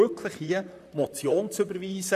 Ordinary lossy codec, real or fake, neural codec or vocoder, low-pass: none; fake; vocoder, 44.1 kHz, 128 mel bands, Pupu-Vocoder; 14.4 kHz